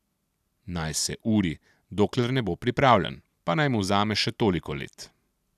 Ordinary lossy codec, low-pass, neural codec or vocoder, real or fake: none; 14.4 kHz; none; real